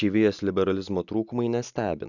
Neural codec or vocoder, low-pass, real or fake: none; 7.2 kHz; real